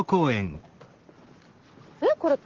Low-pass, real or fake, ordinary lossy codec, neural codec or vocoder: 7.2 kHz; real; Opus, 16 kbps; none